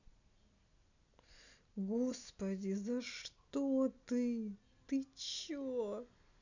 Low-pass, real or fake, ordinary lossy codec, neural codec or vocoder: 7.2 kHz; real; none; none